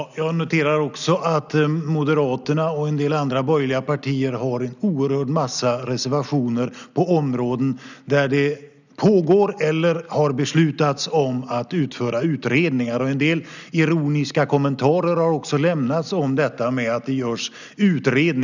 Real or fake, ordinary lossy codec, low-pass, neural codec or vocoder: real; none; 7.2 kHz; none